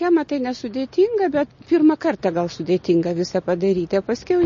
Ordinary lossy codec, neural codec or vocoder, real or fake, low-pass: MP3, 32 kbps; none; real; 7.2 kHz